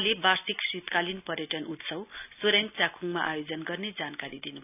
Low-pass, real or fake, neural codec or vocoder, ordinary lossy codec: 3.6 kHz; real; none; none